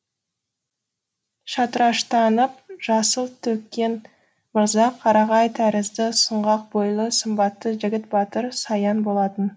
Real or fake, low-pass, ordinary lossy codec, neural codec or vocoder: real; none; none; none